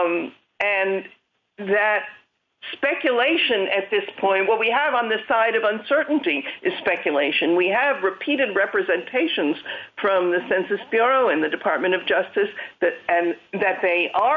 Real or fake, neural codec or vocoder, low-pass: real; none; 7.2 kHz